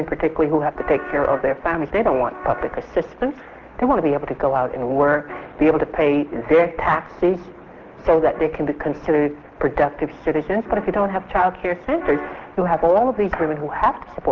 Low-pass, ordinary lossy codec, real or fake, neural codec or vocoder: 7.2 kHz; Opus, 16 kbps; real; none